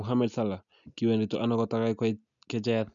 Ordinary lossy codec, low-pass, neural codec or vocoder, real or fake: none; 7.2 kHz; none; real